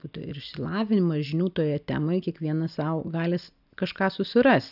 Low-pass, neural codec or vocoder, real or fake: 5.4 kHz; none; real